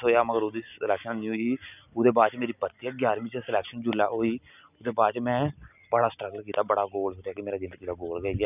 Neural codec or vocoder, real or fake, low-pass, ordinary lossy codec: none; real; 3.6 kHz; none